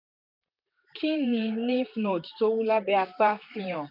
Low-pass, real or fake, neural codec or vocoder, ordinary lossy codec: 5.4 kHz; fake; vocoder, 44.1 kHz, 128 mel bands, Pupu-Vocoder; none